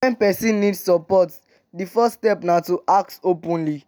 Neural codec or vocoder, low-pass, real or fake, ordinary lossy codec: none; none; real; none